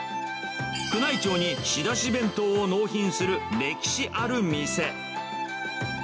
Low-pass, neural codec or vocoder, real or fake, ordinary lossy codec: none; none; real; none